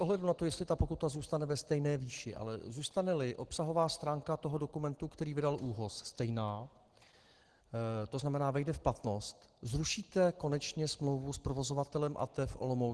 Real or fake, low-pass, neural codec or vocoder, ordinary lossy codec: real; 10.8 kHz; none; Opus, 16 kbps